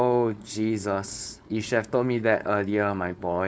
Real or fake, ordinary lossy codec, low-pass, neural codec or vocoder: fake; none; none; codec, 16 kHz, 4.8 kbps, FACodec